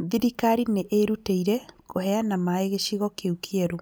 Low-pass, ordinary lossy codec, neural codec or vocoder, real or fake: none; none; none; real